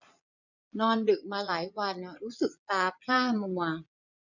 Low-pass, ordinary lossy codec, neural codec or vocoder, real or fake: 7.2 kHz; none; vocoder, 22.05 kHz, 80 mel bands, Vocos; fake